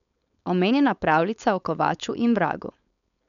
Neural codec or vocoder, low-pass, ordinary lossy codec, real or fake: codec, 16 kHz, 4.8 kbps, FACodec; 7.2 kHz; none; fake